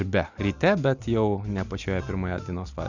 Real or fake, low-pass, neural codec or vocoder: real; 7.2 kHz; none